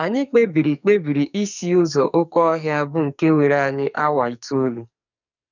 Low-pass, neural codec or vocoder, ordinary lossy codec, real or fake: 7.2 kHz; codec, 44.1 kHz, 2.6 kbps, SNAC; none; fake